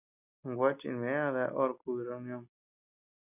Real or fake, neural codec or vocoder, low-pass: real; none; 3.6 kHz